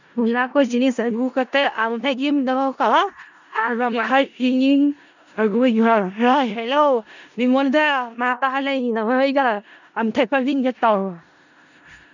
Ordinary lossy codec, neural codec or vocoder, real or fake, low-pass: none; codec, 16 kHz in and 24 kHz out, 0.4 kbps, LongCat-Audio-Codec, four codebook decoder; fake; 7.2 kHz